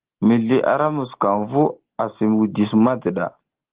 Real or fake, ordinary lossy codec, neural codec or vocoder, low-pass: real; Opus, 16 kbps; none; 3.6 kHz